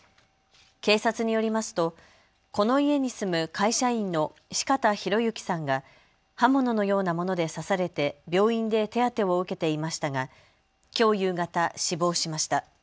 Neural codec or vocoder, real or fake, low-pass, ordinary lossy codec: none; real; none; none